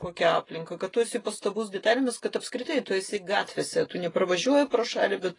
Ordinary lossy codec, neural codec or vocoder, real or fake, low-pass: AAC, 32 kbps; none; real; 10.8 kHz